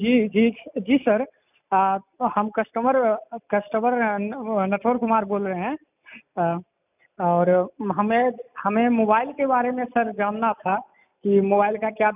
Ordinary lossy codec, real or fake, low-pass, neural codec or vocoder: none; real; 3.6 kHz; none